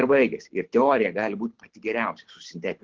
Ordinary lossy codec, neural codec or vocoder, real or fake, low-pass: Opus, 16 kbps; codec, 24 kHz, 6 kbps, HILCodec; fake; 7.2 kHz